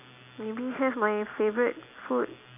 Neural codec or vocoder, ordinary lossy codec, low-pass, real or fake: none; none; 3.6 kHz; real